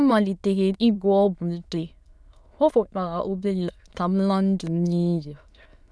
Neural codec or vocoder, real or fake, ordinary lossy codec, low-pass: autoencoder, 22.05 kHz, a latent of 192 numbers a frame, VITS, trained on many speakers; fake; none; none